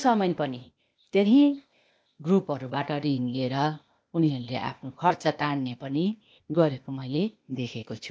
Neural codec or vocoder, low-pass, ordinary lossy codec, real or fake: codec, 16 kHz, 0.8 kbps, ZipCodec; none; none; fake